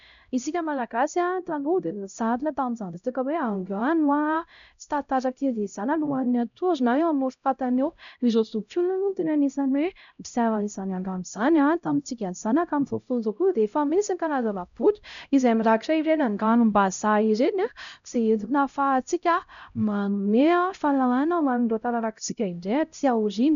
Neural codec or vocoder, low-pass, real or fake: codec, 16 kHz, 0.5 kbps, X-Codec, HuBERT features, trained on LibriSpeech; 7.2 kHz; fake